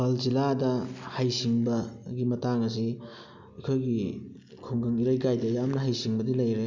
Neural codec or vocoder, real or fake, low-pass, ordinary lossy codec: none; real; 7.2 kHz; none